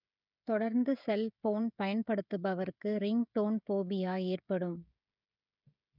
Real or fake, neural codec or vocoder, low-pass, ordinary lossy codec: fake; codec, 16 kHz, 16 kbps, FreqCodec, smaller model; 5.4 kHz; none